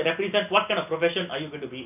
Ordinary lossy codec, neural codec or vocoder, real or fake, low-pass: none; none; real; 3.6 kHz